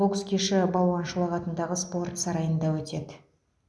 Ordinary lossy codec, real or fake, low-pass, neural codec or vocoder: none; real; none; none